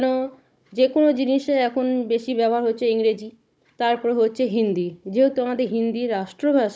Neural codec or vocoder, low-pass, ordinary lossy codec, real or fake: codec, 16 kHz, 16 kbps, FunCodec, trained on Chinese and English, 50 frames a second; none; none; fake